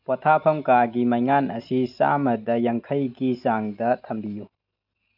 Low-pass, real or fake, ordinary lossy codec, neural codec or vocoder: 5.4 kHz; real; none; none